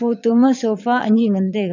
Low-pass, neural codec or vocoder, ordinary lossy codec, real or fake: 7.2 kHz; vocoder, 22.05 kHz, 80 mel bands, Vocos; none; fake